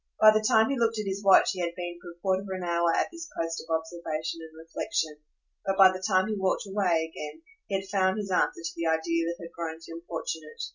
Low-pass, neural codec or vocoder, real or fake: 7.2 kHz; none; real